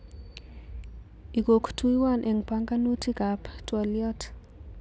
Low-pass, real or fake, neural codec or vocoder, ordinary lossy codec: none; real; none; none